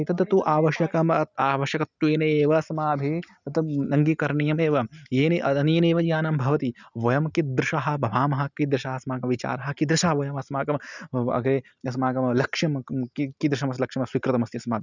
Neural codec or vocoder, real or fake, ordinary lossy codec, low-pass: none; real; none; 7.2 kHz